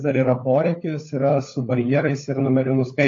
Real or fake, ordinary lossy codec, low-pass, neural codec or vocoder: fake; AAC, 48 kbps; 7.2 kHz; codec, 16 kHz, 4 kbps, FunCodec, trained on LibriTTS, 50 frames a second